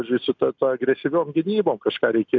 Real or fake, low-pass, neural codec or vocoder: real; 7.2 kHz; none